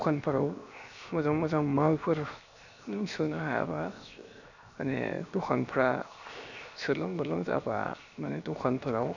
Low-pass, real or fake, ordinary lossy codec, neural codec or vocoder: 7.2 kHz; fake; none; codec, 16 kHz, 0.7 kbps, FocalCodec